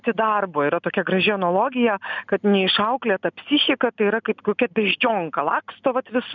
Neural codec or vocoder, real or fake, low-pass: none; real; 7.2 kHz